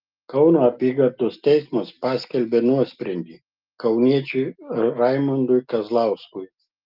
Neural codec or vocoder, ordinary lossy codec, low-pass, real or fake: none; Opus, 32 kbps; 5.4 kHz; real